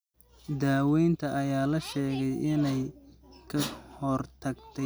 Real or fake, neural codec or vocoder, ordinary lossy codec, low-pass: real; none; none; none